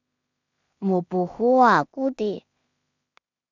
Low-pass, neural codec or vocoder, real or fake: 7.2 kHz; codec, 16 kHz in and 24 kHz out, 0.4 kbps, LongCat-Audio-Codec, two codebook decoder; fake